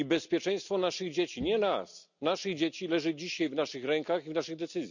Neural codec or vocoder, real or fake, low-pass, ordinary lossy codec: none; real; 7.2 kHz; none